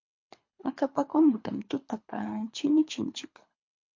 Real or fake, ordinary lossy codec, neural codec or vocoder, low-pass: fake; MP3, 48 kbps; codec, 24 kHz, 3 kbps, HILCodec; 7.2 kHz